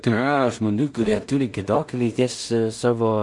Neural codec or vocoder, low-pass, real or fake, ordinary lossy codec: codec, 16 kHz in and 24 kHz out, 0.4 kbps, LongCat-Audio-Codec, two codebook decoder; 10.8 kHz; fake; MP3, 64 kbps